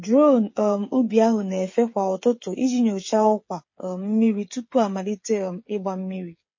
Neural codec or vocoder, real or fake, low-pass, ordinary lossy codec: codec, 16 kHz, 8 kbps, FreqCodec, smaller model; fake; 7.2 kHz; MP3, 32 kbps